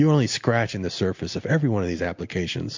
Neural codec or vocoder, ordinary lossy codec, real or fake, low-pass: none; MP3, 64 kbps; real; 7.2 kHz